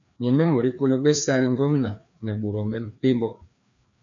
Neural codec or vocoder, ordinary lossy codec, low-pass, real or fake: codec, 16 kHz, 2 kbps, FreqCodec, larger model; MP3, 96 kbps; 7.2 kHz; fake